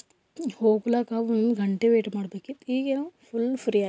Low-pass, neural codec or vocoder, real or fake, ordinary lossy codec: none; none; real; none